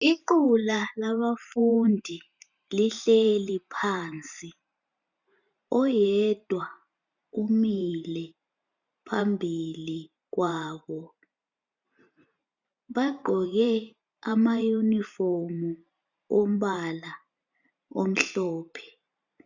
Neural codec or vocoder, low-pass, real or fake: vocoder, 44.1 kHz, 128 mel bands every 512 samples, BigVGAN v2; 7.2 kHz; fake